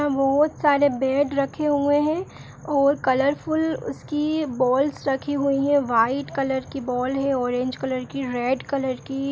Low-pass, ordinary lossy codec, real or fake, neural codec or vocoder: none; none; real; none